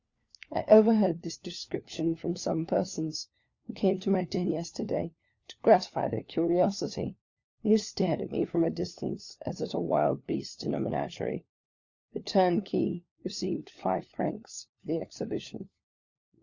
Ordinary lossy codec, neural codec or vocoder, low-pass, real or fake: Opus, 64 kbps; codec, 16 kHz, 4 kbps, FunCodec, trained on LibriTTS, 50 frames a second; 7.2 kHz; fake